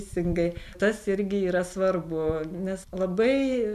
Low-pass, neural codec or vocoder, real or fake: 14.4 kHz; none; real